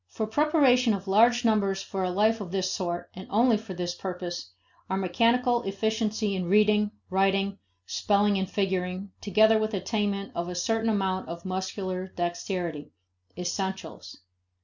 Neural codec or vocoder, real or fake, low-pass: none; real; 7.2 kHz